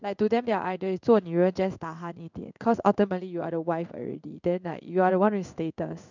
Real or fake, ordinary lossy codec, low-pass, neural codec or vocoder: fake; none; 7.2 kHz; codec, 16 kHz in and 24 kHz out, 1 kbps, XY-Tokenizer